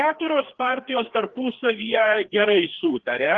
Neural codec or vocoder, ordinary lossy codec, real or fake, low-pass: codec, 16 kHz, 2 kbps, FreqCodec, larger model; Opus, 16 kbps; fake; 7.2 kHz